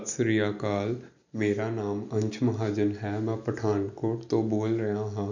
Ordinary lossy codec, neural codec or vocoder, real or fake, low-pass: none; none; real; 7.2 kHz